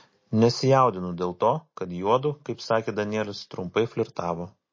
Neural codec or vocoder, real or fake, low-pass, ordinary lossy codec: none; real; 7.2 kHz; MP3, 32 kbps